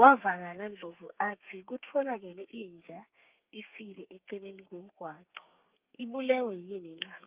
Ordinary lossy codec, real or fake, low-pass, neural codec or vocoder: Opus, 24 kbps; fake; 3.6 kHz; codec, 32 kHz, 1.9 kbps, SNAC